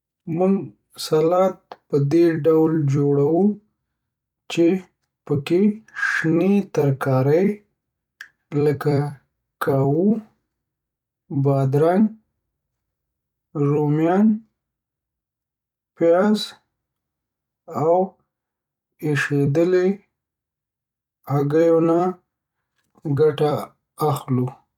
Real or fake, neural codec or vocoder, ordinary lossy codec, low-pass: fake; vocoder, 44.1 kHz, 128 mel bands every 256 samples, BigVGAN v2; none; 19.8 kHz